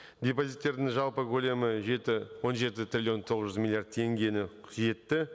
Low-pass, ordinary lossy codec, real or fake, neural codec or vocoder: none; none; real; none